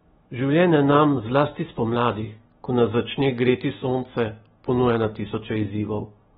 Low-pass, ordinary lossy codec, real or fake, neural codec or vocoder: 19.8 kHz; AAC, 16 kbps; fake; autoencoder, 48 kHz, 128 numbers a frame, DAC-VAE, trained on Japanese speech